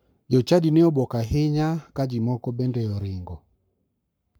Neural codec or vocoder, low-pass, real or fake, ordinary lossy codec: codec, 44.1 kHz, 7.8 kbps, Pupu-Codec; none; fake; none